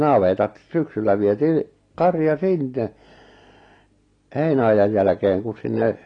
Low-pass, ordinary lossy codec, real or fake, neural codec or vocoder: 9.9 kHz; AAC, 32 kbps; real; none